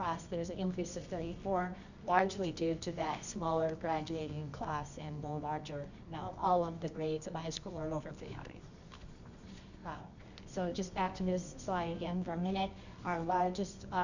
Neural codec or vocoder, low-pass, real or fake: codec, 24 kHz, 0.9 kbps, WavTokenizer, medium music audio release; 7.2 kHz; fake